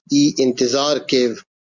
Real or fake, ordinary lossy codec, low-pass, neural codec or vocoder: real; Opus, 64 kbps; 7.2 kHz; none